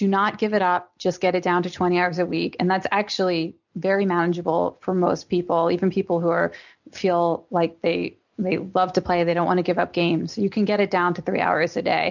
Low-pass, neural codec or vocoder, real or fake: 7.2 kHz; none; real